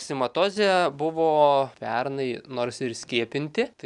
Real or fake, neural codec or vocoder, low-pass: real; none; 10.8 kHz